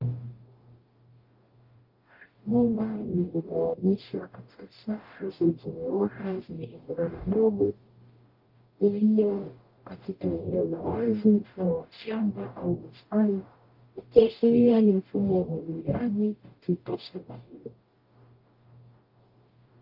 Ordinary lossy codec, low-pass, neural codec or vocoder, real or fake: Opus, 32 kbps; 5.4 kHz; codec, 44.1 kHz, 0.9 kbps, DAC; fake